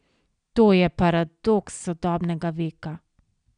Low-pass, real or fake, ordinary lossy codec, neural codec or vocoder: 9.9 kHz; real; none; none